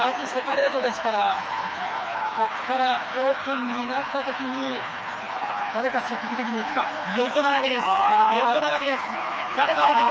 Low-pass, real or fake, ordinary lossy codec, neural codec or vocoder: none; fake; none; codec, 16 kHz, 2 kbps, FreqCodec, smaller model